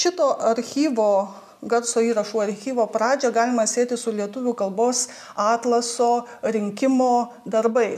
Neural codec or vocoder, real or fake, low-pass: none; real; 14.4 kHz